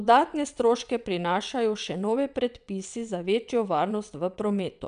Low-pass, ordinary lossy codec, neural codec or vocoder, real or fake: 9.9 kHz; none; vocoder, 22.05 kHz, 80 mel bands, WaveNeXt; fake